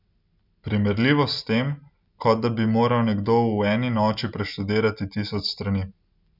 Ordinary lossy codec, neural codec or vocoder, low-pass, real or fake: none; none; 5.4 kHz; real